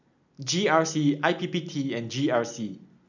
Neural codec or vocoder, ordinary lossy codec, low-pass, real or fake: none; none; 7.2 kHz; real